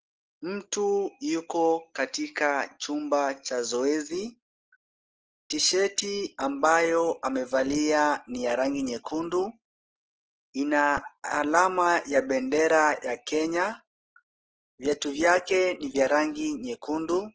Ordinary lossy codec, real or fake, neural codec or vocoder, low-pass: Opus, 16 kbps; real; none; 7.2 kHz